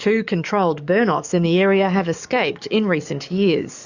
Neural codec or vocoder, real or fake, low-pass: codec, 44.1 kHz, 7.8 kbps, DAC; fake; 7.2 kHz